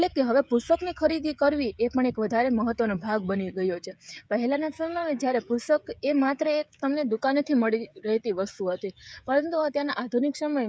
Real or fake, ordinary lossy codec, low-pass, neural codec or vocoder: fake; none; none; codec, 16 kHz, 16 kbps, FreqCodec, smaller model